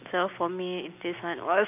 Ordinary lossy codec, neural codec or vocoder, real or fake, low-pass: none; none; real; 3.6 kHz